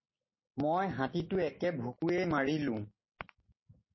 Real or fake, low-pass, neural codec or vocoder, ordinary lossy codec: real; 7.2 kHz; none; MP3, 24 kbps